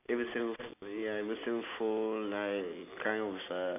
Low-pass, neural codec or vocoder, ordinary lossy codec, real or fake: 3.6 kHz; none; none; real